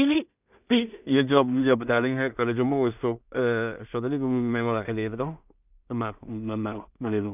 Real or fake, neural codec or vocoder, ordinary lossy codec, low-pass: fake; codec, 16 kHz in and 24 kHz out, 0.4 kbps, LongCat-Audio-Codec, two codebook decoder; none; 3.6 kHz